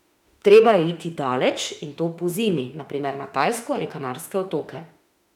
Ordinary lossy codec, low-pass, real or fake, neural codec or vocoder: none; 19.8 kHz; fake; autoencoder, 48 kHz, 32 numbers a frame, DAC-VAE, trained on Japanese speech